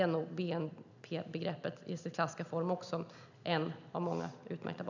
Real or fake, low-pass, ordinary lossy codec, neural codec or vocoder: real; 7.2 kHz; none; none